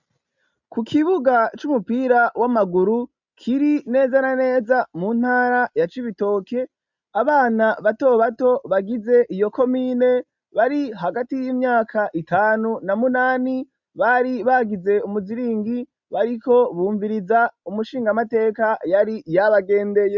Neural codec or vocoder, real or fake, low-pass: none; real; 7.2 kHz